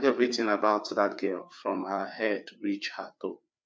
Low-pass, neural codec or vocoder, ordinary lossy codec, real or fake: none; codec, 16 kHz, 4 kbps, FreqCodec, larger model; none; fake